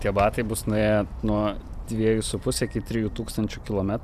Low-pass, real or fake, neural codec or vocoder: 14.4 kHz; fake; vocoder, 44.1 kHz, 128 mel bands every 512 samples, BigVGAN v2